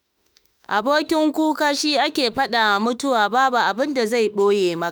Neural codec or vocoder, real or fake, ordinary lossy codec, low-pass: autoencoder, 48 kHz, 32 numbers a frame, DAC-VAE, trained on Japanese speech; fake; none; none